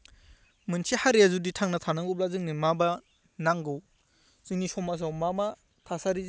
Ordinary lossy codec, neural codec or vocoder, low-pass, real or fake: none; none; none; real